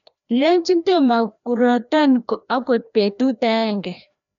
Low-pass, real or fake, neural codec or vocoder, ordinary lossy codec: 7.2 kHz; fake; codec, 16 kHz, 1 kbps, FreqCodec, larger model; none